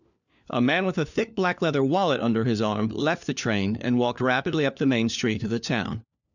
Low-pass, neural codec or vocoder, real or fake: 7.2 kHz; codec, 16 kHz, 4 kbps, FunCodec, trained on LibriTTS, 50 frames a second; fake